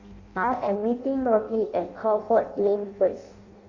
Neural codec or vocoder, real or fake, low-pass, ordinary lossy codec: codec, 16 kHz in and 24 kHz out, 0.6 kbps, FireRedTTS-2 codec; fake; 7.2 kHz; Opus, 64 kbps